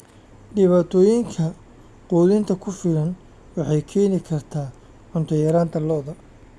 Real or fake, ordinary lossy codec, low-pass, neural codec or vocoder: real; none; none; none